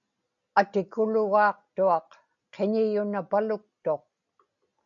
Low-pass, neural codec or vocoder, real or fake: 7.2 kHz; none; real